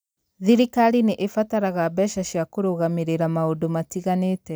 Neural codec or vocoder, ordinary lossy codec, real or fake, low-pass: none; none; real; none